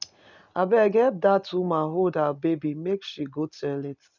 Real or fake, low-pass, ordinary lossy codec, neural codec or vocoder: real; 7.2 kHz; none; none